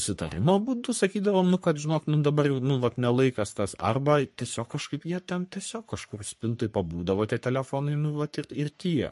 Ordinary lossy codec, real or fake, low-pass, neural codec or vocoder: MP3, 48 kbps; fake; 14.4 kHz; codec, 44.1 kHz, 3.4 kbps, Pupu-Codec